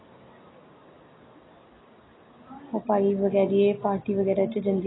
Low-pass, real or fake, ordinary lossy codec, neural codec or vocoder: 7.2 kHz; real; AAC, 16 kbps; none